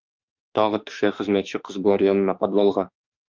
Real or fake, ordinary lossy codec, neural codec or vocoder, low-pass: fake; Opus, 24 kbps; autoencoder, 48 kHz, 32 numbers a frame, DAC-VAE, trained on Japanese speech; 7.2 kHz